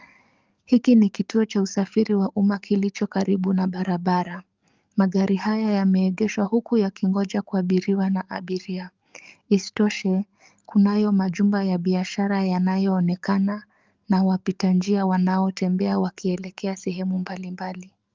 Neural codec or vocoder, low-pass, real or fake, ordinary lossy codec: codec, 44.1 kHz, 7.8 kbps, DAC; 7.2 kHz; fake; Opus, 24 kbps